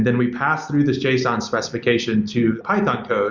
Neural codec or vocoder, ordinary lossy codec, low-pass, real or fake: none; Opus, 64 kbps; 7.2 kHz; real